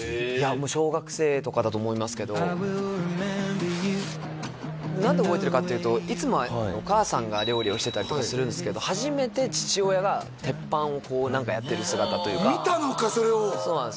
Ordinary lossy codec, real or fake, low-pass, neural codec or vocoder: none; real; none; none